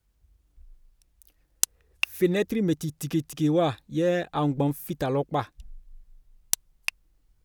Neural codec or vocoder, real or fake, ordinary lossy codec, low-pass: none; real; none; none